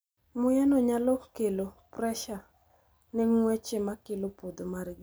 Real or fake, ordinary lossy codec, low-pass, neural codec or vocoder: real; none; none; none